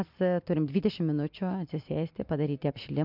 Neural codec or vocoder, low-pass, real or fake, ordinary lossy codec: none; 5.4 kHz; real; MP3, 48 kbps